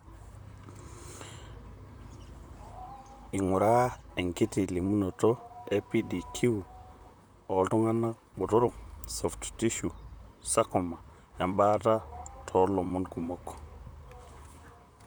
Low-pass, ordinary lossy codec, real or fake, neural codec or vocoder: none; none; fake; vocoder, 44.1 kHz, 128 mel bands, Pupu-Vocoder